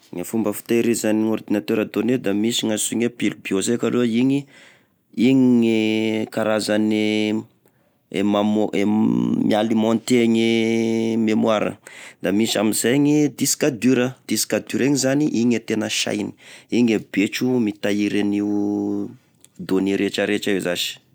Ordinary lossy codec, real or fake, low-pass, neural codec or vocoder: none; real; none; none